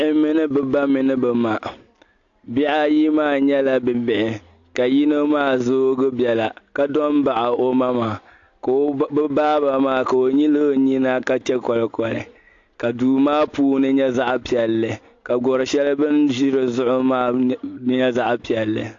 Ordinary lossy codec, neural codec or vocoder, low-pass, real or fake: AAC, 48 kbps; none; 7.2 kHz; real